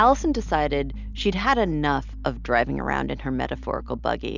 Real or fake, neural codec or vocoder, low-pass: real; none; 7.2 kHz